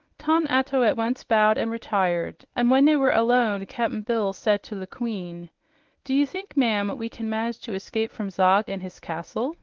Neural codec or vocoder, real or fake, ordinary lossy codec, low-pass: codec, 24 kHz, 0.9 kbps, WavTokenizer, medium speech release version 1; fake; Opus, 16 kbps; 7.2 kHz